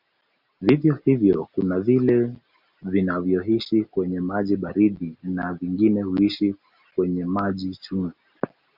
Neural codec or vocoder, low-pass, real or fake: none; 5.4 kHz; real